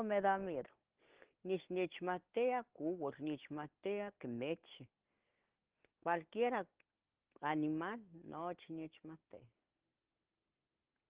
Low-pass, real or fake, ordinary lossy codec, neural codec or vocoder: 3.6 kHz; real; Opus, 16 kbps; none